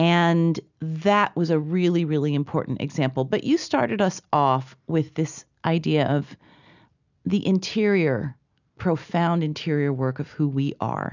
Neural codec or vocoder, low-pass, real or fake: none; 7.2 kHz; real